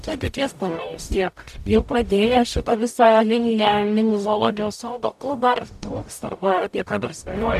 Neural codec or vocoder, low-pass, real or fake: codec, 44.1 kHz, 0.9 kbps, DAC; 14.4 kHz; fake